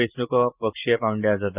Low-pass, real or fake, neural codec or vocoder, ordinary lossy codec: 3.6 kHz; real; none; Opus, 24 kbps